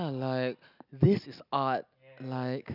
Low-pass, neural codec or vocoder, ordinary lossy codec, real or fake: 5.4 kHz; none; none; real